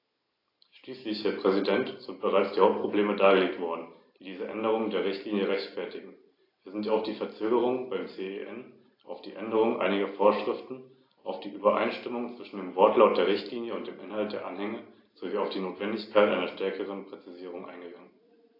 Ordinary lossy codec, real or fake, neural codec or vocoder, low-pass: AAC, 32 kbps; real; none; 5.4 kHz